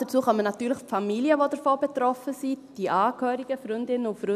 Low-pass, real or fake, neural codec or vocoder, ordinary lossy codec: 14.4 kHz; real; none; none